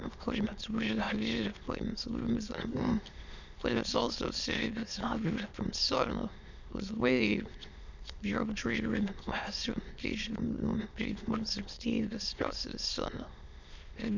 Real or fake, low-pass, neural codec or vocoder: fake; 7.2 kHz; autoencoder, 22.05 kHz, a latent of 192 numbers a frame, VITS, trained on many speakers